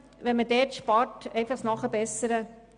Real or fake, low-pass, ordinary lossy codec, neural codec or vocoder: real; 9.9 kHz; none; none